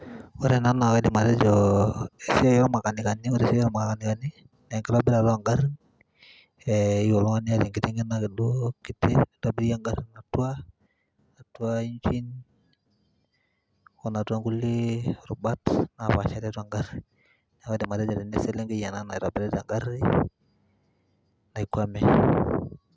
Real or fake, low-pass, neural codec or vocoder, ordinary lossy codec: real; none; none; none